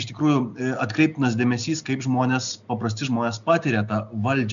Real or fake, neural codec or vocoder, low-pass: real; none; 7.2 kHz